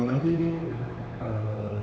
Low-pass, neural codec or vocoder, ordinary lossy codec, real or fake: none; codec, 16 kHz, 4 kbps, X-Codec, HuBERT features, trained on LibriSpeech; none; fake